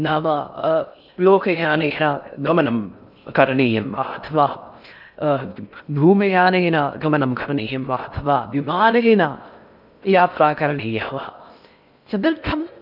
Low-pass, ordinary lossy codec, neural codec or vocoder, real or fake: 5.4 kHz; none; codec, 16 kHz in and 24 kHz out, 0.6 kbps, FocalCodec, streaming, 4096 codes; fake